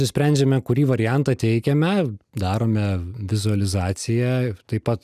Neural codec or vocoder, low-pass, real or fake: none; 14.4 kHz; real